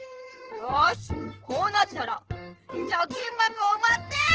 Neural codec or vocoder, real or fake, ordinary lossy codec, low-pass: codec, 16 kHz in and 24 kHz out, 2.2 kbps, FireRedTTS-2 codec; fake; Opus, 16 kbps; 7.2 kHz